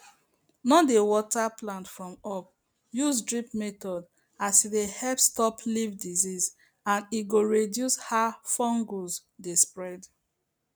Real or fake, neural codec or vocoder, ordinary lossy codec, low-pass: real; none; none; none